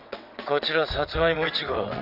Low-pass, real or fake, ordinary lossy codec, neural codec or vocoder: 5.4 kHz; fake; none; vocoder, 22.05 kHz, 80 mel bands, WaveNeXt